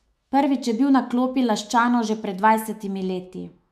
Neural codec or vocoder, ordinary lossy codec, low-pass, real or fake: autoencoder, 48 kHz, 128 numbers a frame, DAC-VAE, trained on Japanese speech; AAC, 96 kbps; 14.4 kHz; fake